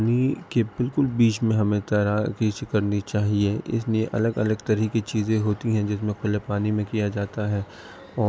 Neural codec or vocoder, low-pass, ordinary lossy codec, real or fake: none; none; none; real